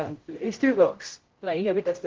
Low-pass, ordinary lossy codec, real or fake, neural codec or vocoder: 7.2 kHz; Opus, 16 kbps; fake; codec, 16 kHz, 0.5 kbps, X-Codec, HuBERT features, trained on general audio